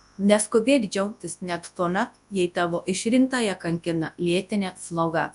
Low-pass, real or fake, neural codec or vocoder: 10.8 kHz; fake; codec, 24 kHz, 0.9 kbps, WavTokenizer, large speech release